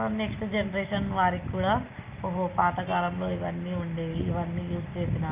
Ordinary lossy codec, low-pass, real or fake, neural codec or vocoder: Opus, 24 kbps; 3.6 kHz; real; none